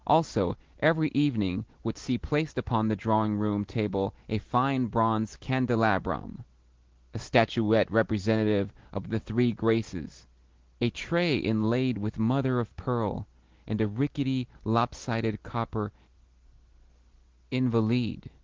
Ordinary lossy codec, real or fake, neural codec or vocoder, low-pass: Opus, 16 kbps; real; none; 7.2 kHz